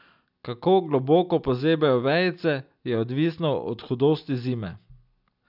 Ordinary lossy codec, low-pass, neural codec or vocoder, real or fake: none; 5.4 kHz; none; real